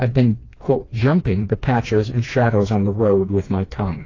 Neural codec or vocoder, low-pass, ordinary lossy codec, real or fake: codec, 16 kHz, 2 kbps, FreqCodec, smaller model; 7.2 kHz; AAC, 32 kbps; fake